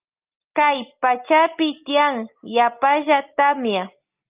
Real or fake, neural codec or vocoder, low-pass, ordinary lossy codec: real; none; 3.6 kHz; Opus, 24 kbps